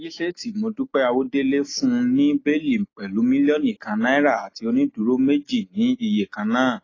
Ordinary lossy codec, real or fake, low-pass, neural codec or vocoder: AAC, 32 kbps; real; 7.2 kHz; none